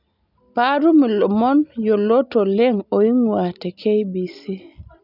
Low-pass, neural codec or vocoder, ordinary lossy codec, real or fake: 5.4 kHz; none; none; real